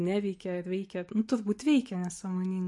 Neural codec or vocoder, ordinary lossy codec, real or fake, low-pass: none; MP3, 48 kbps; real; 10.8 kHz